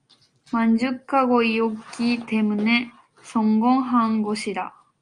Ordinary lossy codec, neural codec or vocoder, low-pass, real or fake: Opus, 32 kbps; none; 9.9 kHz; real